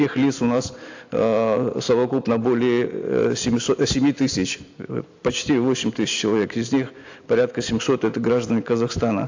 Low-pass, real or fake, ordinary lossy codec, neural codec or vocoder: 7.2 kHz; real; AAC, 48 kbps; none